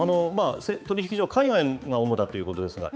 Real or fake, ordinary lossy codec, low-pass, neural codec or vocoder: fake; none; none; codec, 16 kHz, 4 kbps, X-Codec, HuBERT features, trained on balanced general audio